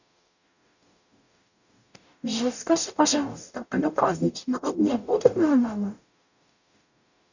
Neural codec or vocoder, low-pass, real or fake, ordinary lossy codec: codec, 44.1 kHz, 0.9 kbps, DAC; 7.2 kHz; fake; none